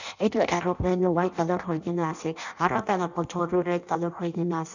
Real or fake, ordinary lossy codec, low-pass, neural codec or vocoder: fake; none; 7.2 kHz; codec, 16 kHz in and 24 kHz out, 0.6 kbps, FireRedTTS-2 codec